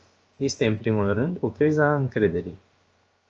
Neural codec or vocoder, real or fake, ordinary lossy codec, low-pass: codec, 16 kHz, about 1 kbps, DyCAST, with the encoder's durations; fake; Opus, 24 kbps; 7.2 kHz